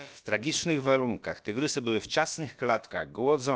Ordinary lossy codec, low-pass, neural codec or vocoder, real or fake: none; none; codec, 16 kHz, about 1 kbps, DyCAST, with the encoder's durations; fake